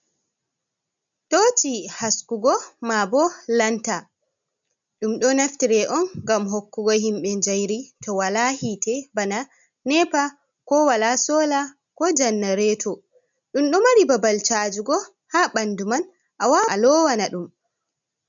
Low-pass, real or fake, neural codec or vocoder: 7.2 kHz; real; none